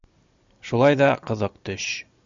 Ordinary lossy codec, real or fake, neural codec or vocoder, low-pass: MP3, 64 kbps; real; none; 7.2 kHz